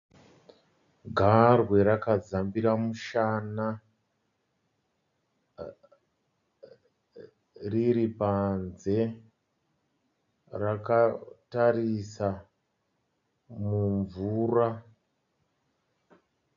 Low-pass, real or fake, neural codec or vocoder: 7.2 kHz; real; none